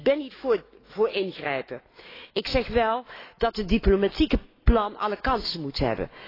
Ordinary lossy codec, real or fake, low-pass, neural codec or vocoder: AAC, 24 kbps; fake; 5.4 kHz; autoencoder, 48 kHz, 128 numbers a frame, DAC-VAE, trained on Japanese speech